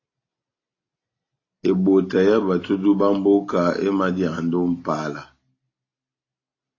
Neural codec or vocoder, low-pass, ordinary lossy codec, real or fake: none; 7.2 kHz; AAC, 32 kbps; real